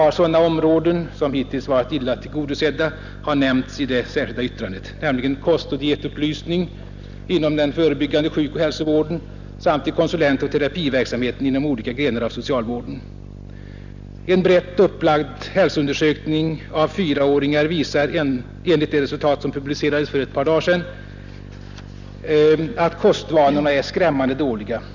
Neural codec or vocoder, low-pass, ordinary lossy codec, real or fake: none; 7.2 kHz; none; real